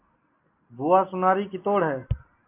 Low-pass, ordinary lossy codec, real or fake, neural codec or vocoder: 3.6 kHz; AAC, 24 kbps; real; none